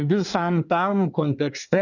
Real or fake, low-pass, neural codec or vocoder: fake; 7.2 kHz; codec, 24 kHz, 1 kbps, SNAC